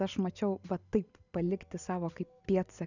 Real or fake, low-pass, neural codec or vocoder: real; 7.2 kHz; none